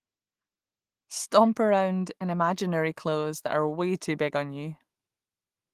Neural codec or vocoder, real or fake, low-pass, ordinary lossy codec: autoencoder, 48 kHz, 128 numbers a frame, DAC-VAE, trained on Japanese speech; fake; 14.4 kHz; Opus, 24 kbps